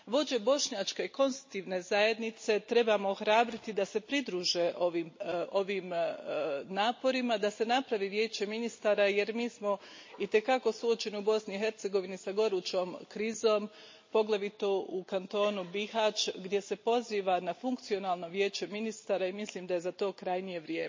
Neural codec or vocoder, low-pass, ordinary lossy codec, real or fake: none; 7.2 kHz; MP3, 32 kbps; real